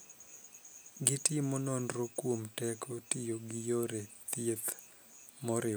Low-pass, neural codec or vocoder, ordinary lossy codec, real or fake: none; none; none; real